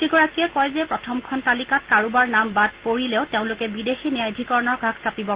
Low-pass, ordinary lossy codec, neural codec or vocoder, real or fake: 3.6 kHz; Opus, 16 kbps; none; real